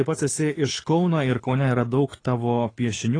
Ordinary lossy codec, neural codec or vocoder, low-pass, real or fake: AAC, 32 kbps; codec, 24 kHz, 6 kbps, HILCodec; 9.9 kHz; fake